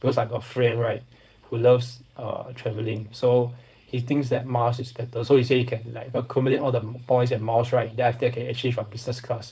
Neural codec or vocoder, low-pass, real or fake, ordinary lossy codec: codec, 16 kHz, 4.8 kbps, FACodec; none; fake; none